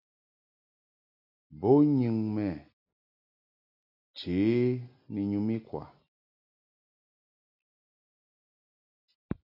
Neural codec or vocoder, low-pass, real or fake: none; 5.4 kHz; real